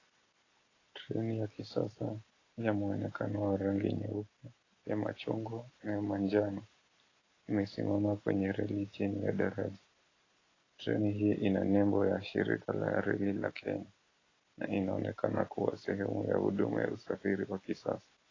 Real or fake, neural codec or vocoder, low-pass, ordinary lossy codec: real; none; 7.2 kHz; AAC, 32 kbps